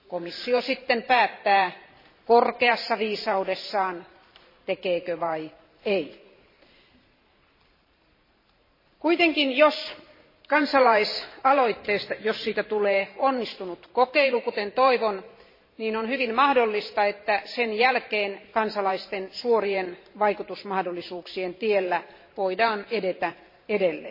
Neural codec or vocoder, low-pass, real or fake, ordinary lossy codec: vocoder, 44.1 kHz, 128 mel bands every 512 samples, BigVGAN v2; 5.4 kHz; fake; MP3, 24 kbps